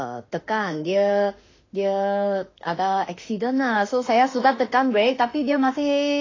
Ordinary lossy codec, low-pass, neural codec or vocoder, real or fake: AAC, 32 kbps; 7.2 kHz; autoencoder, 48 kHz, 32 numbers a frame, DAC-VAE, trained on Japanese speech; fake